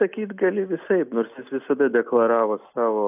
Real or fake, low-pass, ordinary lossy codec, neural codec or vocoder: real; 3.6 kHz; AAC, 32 kbps; none